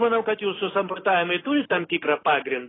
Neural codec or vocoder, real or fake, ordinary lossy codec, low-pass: none; real; AAC, 16 kbps; 7.2 kHz